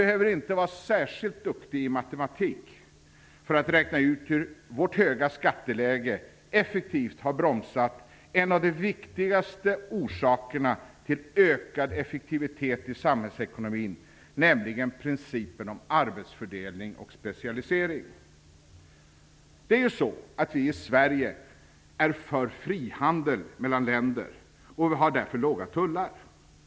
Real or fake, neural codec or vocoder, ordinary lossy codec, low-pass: real; none; none; none